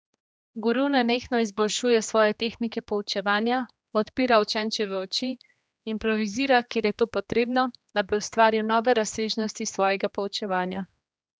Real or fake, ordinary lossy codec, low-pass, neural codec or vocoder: fake; none; none; codec, 16 kHz, 2 kbps, X-Codec, HuBERT features, trained on general audio